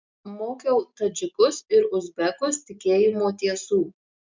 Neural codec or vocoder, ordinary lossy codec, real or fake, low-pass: none; MP3, 64 kbps; real; 7.2 kHz